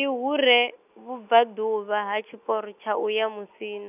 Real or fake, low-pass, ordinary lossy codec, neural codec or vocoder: real; 3.6 kHz; none; none